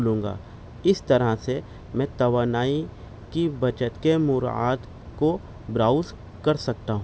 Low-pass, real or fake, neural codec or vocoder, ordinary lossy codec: none; real; none; none